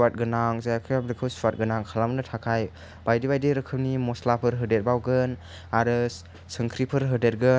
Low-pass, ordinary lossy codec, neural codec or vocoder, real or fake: none; none; none; real